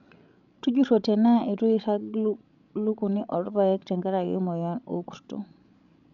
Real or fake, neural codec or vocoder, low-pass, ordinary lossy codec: fake; codec, 16 kHz, 16 kbps, FreqCodec, larger model; 7.2 kHz; none